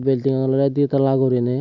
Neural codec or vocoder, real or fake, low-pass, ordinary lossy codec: none; real; 7.2 kHz; none